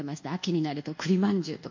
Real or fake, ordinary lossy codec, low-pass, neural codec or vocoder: fake; MP3, 48 kbps; 7.2 kHz; codec, 24 kHz, 1.2 kbps, DualCodec